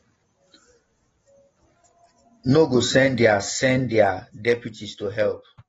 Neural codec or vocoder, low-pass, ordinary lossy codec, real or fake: none; 19.8 kHz; AAC, 24 kbps; real